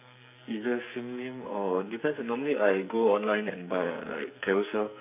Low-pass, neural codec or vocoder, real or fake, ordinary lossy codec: 3.6 kHz; codec, 44.1 kHz, 2.6 kbps, SNAC; fake; none